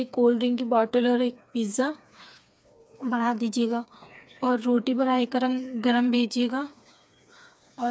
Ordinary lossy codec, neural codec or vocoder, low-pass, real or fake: none; codec, 16 kHz, 4 kbps, FreqCodec, smaller model; none; fake